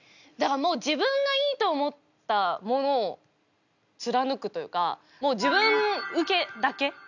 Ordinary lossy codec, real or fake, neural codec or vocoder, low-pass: none; real; none; 7.2 kHz